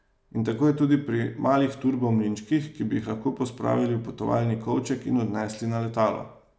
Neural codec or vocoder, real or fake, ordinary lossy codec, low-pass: none; real; none; none